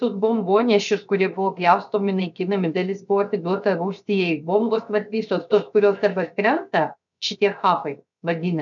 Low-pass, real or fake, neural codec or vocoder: 7.2 kHz; fake; codec, 16 kHz, 0.7 kbps, FocalCodec